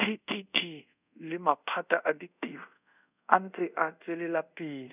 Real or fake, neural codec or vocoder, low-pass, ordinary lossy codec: fake; codec, 24 kHz, 0.5 kbps, DualCodec; 3.6 kHz; none